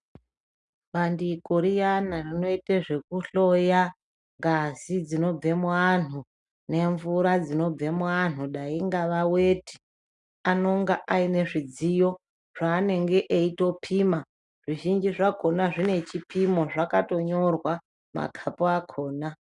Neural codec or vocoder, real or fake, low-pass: none; real; 10.8 kHz